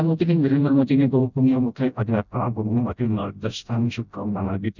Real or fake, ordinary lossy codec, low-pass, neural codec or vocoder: fake; none; 7.2 kHz; codec, 16 kHz, 0.5 kbps, FreqCodec, smaller model